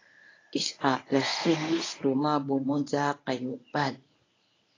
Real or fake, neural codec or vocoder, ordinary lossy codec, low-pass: fake; codec, 24 kHz, 0.9 kbps, WavTokenizer, medium speech release version 1; AAC, 32 kbps; 7.2 kHz